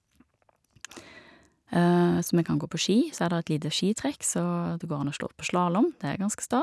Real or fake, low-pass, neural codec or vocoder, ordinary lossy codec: real; none; none; none